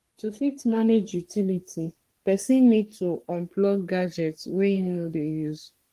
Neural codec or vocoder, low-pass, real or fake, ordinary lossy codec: codec, 44.1 kHz, 3.4 kbps, Pupu-Codec; 14.4 kHz; fake; Opus, 24 kbps